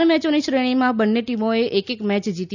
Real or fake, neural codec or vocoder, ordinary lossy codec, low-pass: real; none; none; 7.2 kHz